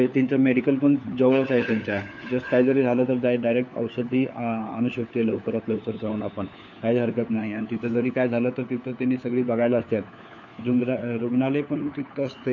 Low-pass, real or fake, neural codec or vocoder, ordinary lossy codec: 7.2 kHz; fake; codec, 16 kHz, 4 kbps, FunCodec, trained on LibriTTS, 50 frames a second; none